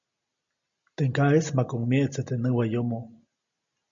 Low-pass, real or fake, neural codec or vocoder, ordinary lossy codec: 7.2 kHz; real; none; MP3, 64 kbps